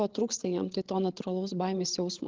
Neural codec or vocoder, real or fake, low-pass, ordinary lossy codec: codec, 16 kHz, 16 kbps, FreqCodec, larger model; fake; 7.2 kHz; Opus, 16 kbps